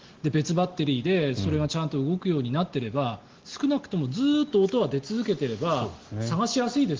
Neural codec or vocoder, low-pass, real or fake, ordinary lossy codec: none; 7.2 kHz; real; Opus, 16 kbps